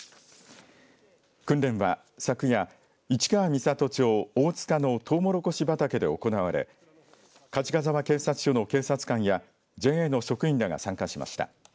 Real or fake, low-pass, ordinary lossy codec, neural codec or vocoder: real; none; none; none